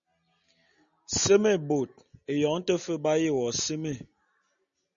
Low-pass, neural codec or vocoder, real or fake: 7.2 kHz; none; real